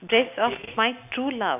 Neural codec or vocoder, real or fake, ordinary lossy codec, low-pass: none; real; none; 3.6 kHz